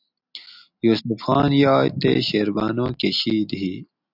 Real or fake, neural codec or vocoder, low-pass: real; none; 5.4 kHz